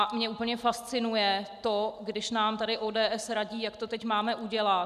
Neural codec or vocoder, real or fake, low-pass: none; real; 14.4 kHz